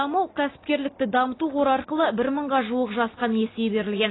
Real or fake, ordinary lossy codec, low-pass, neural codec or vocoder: real; AAC, 16 kbps; 7.2 kHz; none